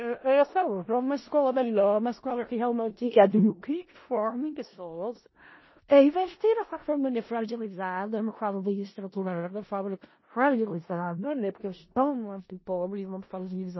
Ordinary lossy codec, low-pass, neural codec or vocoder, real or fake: MP3, 24 kbps; 7.2 kHz; codec, 16 kHz in and 24 kHz out, 0.4 kbps, LongCat-Audio-Codec, four codebook decoder; fake